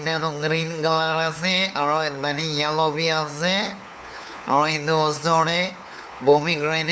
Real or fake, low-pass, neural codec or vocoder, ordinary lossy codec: fake; none; codec, 16 kHz, 4 kbps, FunCodec, trained on LibriTTS, 50 frames a second; none